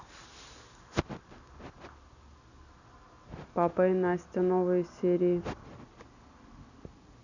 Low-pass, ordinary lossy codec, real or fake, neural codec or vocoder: 7.2 kHz; none; real; none